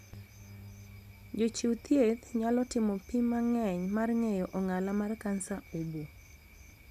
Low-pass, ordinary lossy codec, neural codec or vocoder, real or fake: 14.4 kHz; none; none; real